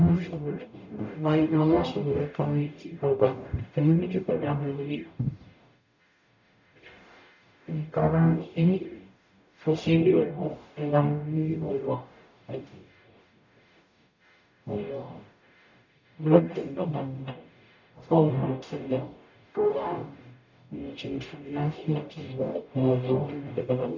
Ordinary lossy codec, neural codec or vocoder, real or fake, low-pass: none; codec, 44.1 kHz, 0.9 kbps, DAC; fake; 7.2 kHz